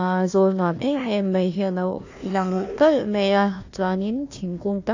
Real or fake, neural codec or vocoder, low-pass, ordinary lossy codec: fake; codec, 16 kHz, 1 kbps, FunCodec, trained on Chinese and English, 50 frames a second; 7.2 kHz; AAC, 48 kbps